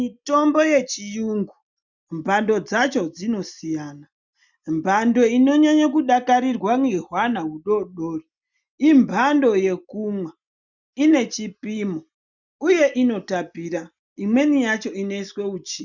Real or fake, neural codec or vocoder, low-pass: real; none; 7.2 kHz